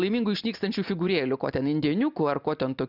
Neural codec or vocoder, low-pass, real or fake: none; 5.4 kHz; real